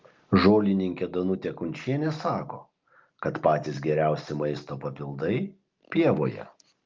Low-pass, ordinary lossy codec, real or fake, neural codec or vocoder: 7.2 kHz; Opus, 32 kbps; real; none